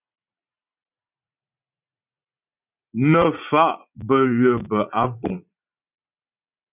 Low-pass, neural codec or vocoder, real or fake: 3.6 kHz; vocoder, 44.1 kHz, 80 mel bands, Vocos; fake